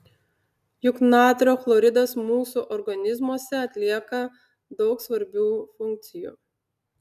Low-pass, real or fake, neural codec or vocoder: 14.4 kHz; real; none